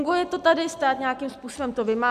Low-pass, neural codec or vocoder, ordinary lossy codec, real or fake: 14.4 kHz; none; AAC, 96 kbps; real